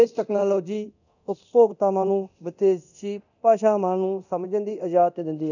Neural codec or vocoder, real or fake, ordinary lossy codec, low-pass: codec, 24 kHz, 0.9 kbps, DualCodec; fake; none; 7.2 kHz